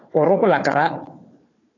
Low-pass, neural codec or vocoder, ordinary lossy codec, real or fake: 7.2 kHz; codec, 16 kHz, 4 kbps, FunCodec, trained on Chinese and English, 50 frames a second; AAC, 32 kbps; fake